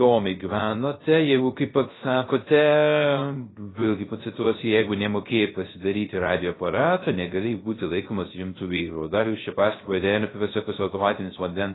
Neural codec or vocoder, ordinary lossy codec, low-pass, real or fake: codec, 16 kHz, 0.3 kbps, FocalCodec; AAC, 16 kbps; 7.2 kHz; fake